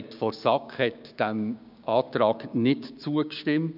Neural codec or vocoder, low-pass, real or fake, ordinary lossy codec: codec, 16 kHz, 6 kbps, DAC; 5.4 kHz; fake; none